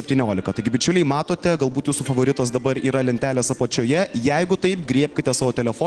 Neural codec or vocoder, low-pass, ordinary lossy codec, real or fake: none; 9.9 kHz; Opus, 16 kbps; real